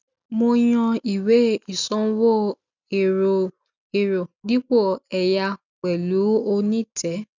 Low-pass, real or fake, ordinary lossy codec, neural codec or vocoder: 7.2 kHz; real; none; none